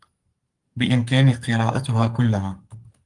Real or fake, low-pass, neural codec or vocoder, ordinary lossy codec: fake; 10.8 kHz; codec, 44.1 kHz, 2.6 kbps, SNAC; Opus, 24 kbps